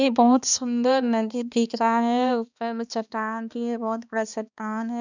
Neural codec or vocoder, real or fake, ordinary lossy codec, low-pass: codec, 16 kHz, 2 kbps, X-Codec, HuBERT features, trained on balanced general audio; fake; none; 7.2 kHz